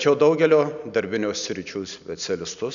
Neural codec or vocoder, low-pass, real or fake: none; 7.2 kHz; real